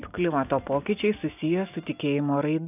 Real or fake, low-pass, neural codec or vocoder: real; 3.6 kHz; none